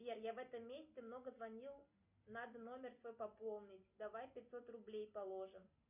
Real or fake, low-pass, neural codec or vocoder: real; 3.6 kHz; none